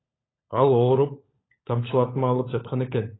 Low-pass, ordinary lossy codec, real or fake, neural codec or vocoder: 7.2 kHz; AAC, 16 kbps; fake; codec, 16 kHz, 16 kbps, FunCodec, trained on LibriTTS, 50 frames a second